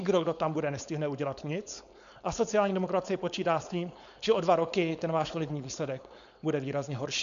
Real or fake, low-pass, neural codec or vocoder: fake; 7.2 kHz; codec, 16 kHz, 4.8 kbps, FACodec